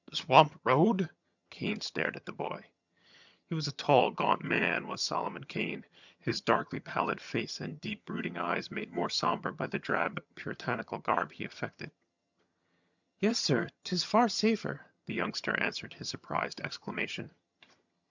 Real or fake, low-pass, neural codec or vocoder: fake; 7.2 kHz; vocoder, 22.05 kHz, 80 mel bands, HiFi-GAN